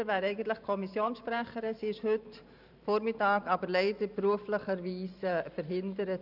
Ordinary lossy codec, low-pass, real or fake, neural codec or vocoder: none; 5.4 kHz; fake; vocoder, 44.1 kHz, 128 mel bands every 512 samples, BigVGAN v2